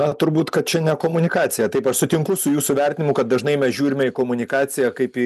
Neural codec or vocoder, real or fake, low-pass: none; real; 14.4 kHz